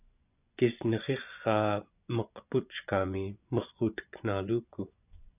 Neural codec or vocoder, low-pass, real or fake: vocoder, 24 kHz, 100 mel bands, Vocos; 3.6 kHz; fake